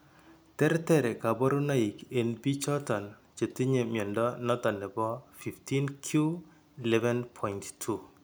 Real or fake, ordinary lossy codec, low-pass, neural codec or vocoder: real; none; none; none